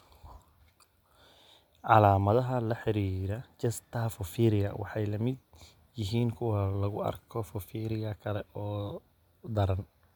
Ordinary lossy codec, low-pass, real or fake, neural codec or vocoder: none; 19.8 kHz; real; none